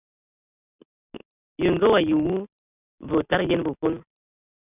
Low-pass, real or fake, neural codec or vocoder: 3.6 kHz; real; none